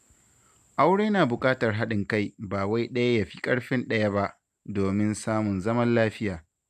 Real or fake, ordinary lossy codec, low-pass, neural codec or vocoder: real; none; 14.4 kHz; none